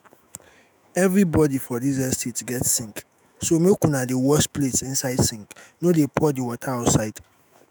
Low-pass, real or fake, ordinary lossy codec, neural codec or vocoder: none; fake; none; autoencoder, 48 kHz, 128 numbers a frame, DAC-VAE, trained on Japanese speech